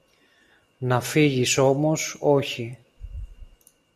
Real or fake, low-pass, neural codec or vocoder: real; 14.4 kHz; none